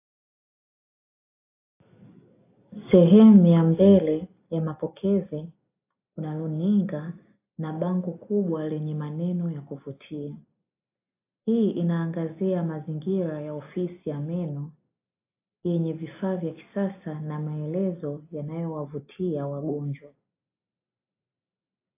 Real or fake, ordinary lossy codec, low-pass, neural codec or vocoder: real; AAC, 24 kbps; 3.6 kHz; none